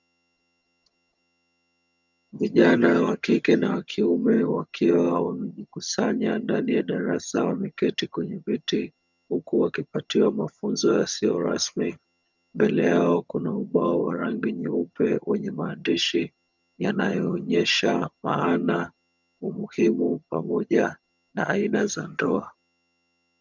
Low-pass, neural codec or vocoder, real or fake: 7.2 kHz; vocoder, 22.05 kHz, 80 mel bands, HiFi-GAN; fake